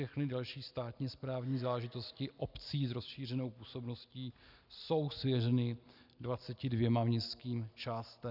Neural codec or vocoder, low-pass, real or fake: none; 5.4 kHz; real